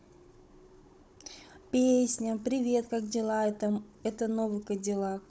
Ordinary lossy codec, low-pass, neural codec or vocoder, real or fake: none; none; codec, 16 kHz, 16 kbps, FunCodec, trained on Chinese and English, 50 frames a second; fake